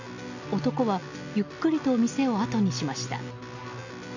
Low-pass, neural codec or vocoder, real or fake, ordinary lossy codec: 7.2 kHz; none; real; none